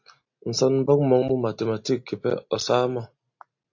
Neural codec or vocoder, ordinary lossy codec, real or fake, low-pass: none; AAC, 48 kbps; real; 7.2 kHz